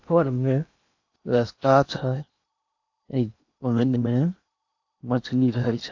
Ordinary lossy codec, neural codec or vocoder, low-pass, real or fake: AAC, 48 kbps; codec, 16 kHz in and 24 kHz out, 0.8 kbps, FocalCodec, streaming, 65536 codes; 7.2 kHz; fake